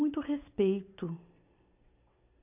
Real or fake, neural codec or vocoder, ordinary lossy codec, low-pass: real; none; AAC, 32 kbps; 3.6 kHz